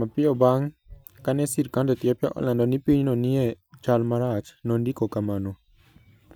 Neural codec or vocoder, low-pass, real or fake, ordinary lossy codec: none; none; real; none